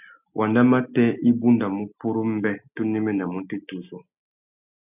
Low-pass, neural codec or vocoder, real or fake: 3.6 kHz; none; real